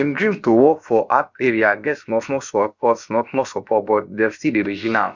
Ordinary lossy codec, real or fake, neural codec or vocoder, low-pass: Opus, 64 kbps; fake; codec, 16 kHz, about 1 kbps, DyCAST, with the encoder's durations; 7.2 kHz